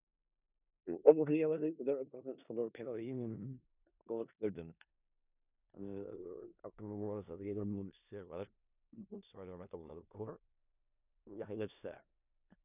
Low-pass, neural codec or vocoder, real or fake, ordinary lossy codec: 3.6 kHz; codec, 16 kHz in and 24 kHz out, 0.4 kbps, LongCat-Audio-Codec, four codebook decoder; fake; none